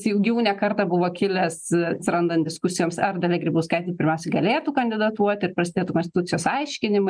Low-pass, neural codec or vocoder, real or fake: 9.9 kHz; none; real